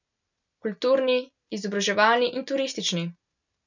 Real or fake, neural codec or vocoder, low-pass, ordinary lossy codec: real; none; 7.2 kHz; none